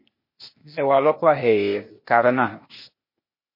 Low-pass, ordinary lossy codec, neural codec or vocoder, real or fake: 5.4 kHz; MP3, 24 kbps; codec, 16 kHz, 0.8 kbps, ZipCodec; fake